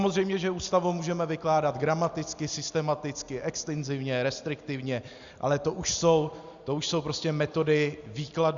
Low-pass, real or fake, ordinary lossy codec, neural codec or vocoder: 7.2 kHz; real; Opus, 64 kbps; none